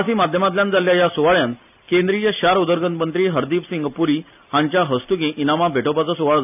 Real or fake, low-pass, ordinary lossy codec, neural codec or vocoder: real; 3.6 kHz; none; none